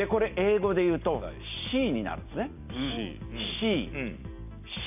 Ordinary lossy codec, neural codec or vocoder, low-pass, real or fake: none; none; 3.6 kHz; real